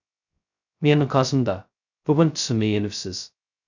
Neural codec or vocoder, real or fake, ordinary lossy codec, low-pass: codec, 16 kHz, 0.2 kbps, FocalCodec; fake; none; 7.2 kHz